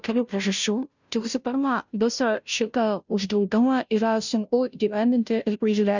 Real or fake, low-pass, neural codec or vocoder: fake; 7.2 kHz; codec, 16 kHz, 0.5 kbps, FunCodec, trained on Chinese and English, 25 frames a second